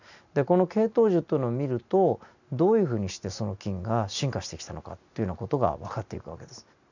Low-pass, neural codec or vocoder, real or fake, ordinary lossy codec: 7.2 kHz; none; real; none